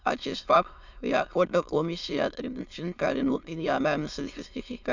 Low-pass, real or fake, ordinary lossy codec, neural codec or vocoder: 7.2 kHz; fake; none; autoencoder, 22.05 kHz, a latent of 192 numbers a frame, VITS, trained on many speakers